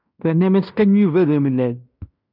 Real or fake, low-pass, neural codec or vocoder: fake; 5.4 kHz; codec, 16 kHz in and 24 kHz out, 0.9 kbps, LongCat-Audio-Codec, fine tuned four codebook decoder